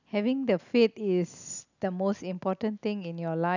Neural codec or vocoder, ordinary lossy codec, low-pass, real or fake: none; none; 7.2 kHz; real